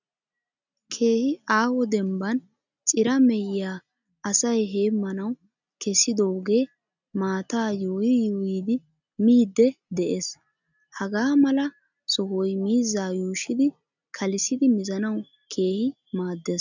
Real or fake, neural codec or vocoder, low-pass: real; none; 7.2 kHz